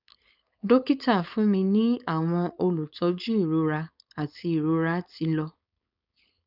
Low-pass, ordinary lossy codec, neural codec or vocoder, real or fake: 5.4 kHz; none; codec, 16 kHz, 4.8 kbps, FACodec; fake